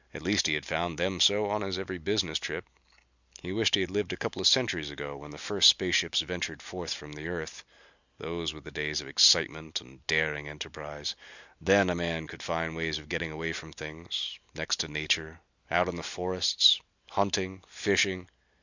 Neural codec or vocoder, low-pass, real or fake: none; 7.2 kHz; real